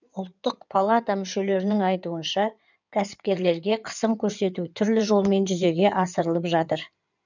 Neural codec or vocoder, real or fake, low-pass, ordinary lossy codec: codec, 16 kHz in and 24 kHz out, 2.2 kbps, FireRedTTS-2 codec; fake; 7.2 kHz; none